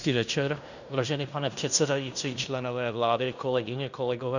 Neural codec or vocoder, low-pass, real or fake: codec, 16 kHz in and 24 kHz out, 0.9 kbps, LongCat-Audio-Codec, fine tuned four codebook decoder; 7.2 kHz; fake